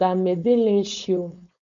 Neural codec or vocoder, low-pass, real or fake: codec, 16 kHz, 4.8 kbps, FACodec; 7.2 kHz; fake